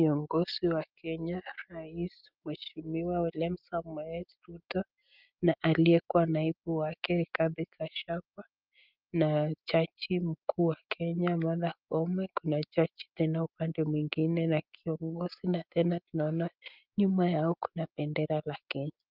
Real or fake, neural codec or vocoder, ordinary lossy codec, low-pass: real; none; Opus, 24 kbps; 5.4 kHz